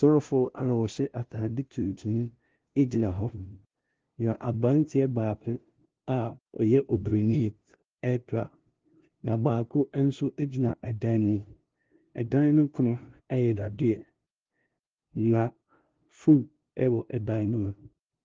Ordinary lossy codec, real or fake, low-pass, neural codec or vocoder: Opus, 16 kbps; fake; 7.2 kHz; codec, 16 kHz, 0.5 kbps, FunCodec, trained on LibriTTS, 25 frames a second